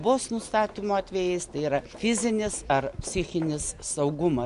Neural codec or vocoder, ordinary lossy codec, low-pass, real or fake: none; MP3, 64 kbps; 10.8 kHz; real